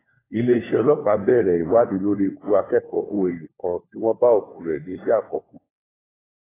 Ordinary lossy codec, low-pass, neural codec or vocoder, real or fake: AAC, 16 kbps; 3.6 kHz; codec, 16 kHz, 4 kbps, FunCodec, trained on LibriTTS, 50 frames a second; fake